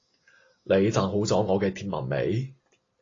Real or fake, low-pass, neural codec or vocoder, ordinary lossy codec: real; 7.2 kHz; none; AAC, 32 kbps